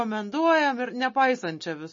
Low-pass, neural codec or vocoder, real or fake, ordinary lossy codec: 7.2 kHz; none; real; MP3, 32 kbps